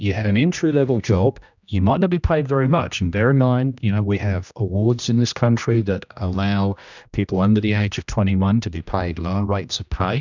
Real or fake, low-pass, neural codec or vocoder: fake; 7.2 kHz; codec, 16 kHz, 1 kbps, X-Codec, HuBERT features, trained on general audio